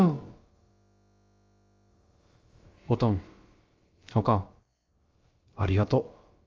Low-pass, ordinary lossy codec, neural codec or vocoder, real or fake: 7.2 kHz; Opus, 32 kbps; codec, 16 kHz, about 1 kbps, DyCAST, with the encoder's durations; fake